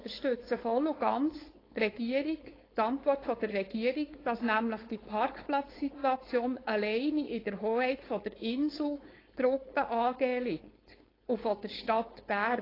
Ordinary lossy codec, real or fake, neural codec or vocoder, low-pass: AAC, 24 kbps; fake; codec, 16 kHz, 4.8 kbps, FACodec; 5.4 kHz